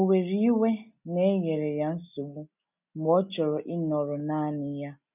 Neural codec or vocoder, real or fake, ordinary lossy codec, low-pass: none; real; none; 3.6 kHz